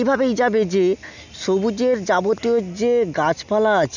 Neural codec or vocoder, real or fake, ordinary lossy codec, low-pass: none; real; none; 7.2 kHz